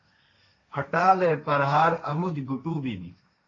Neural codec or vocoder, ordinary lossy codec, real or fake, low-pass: codec, 16 kHz, 1.1 kbps, Voila-Tokenizer; AAC, 32 kbps; fake; 7.2 kHz